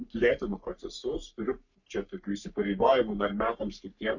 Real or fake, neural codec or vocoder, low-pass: fake; codec, 44.1 kHz, 3.4 kbps, Pupu-Codec; 7.2 kHz